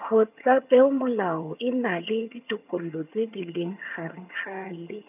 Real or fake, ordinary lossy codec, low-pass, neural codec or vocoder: fake; none; 3.6 kHz; vocoder, 22.05 kHz, 80 mel bands, HiFi-GAN